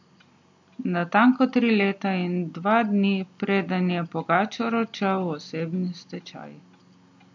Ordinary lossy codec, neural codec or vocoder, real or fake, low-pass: MP3, 48 kbps; none; real; 7.2 kHz